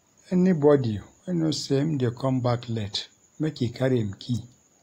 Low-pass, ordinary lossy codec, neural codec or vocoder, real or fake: 19.8 kHz; AAC, 48 kbps; none; real